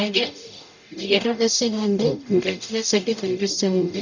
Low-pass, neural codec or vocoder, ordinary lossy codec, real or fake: 7.2 kHz; codec, 44.1 kHz, 0.9 kbps, DAC; none; fake